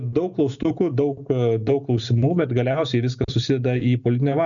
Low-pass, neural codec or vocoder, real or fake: 7.2 kHz; none; real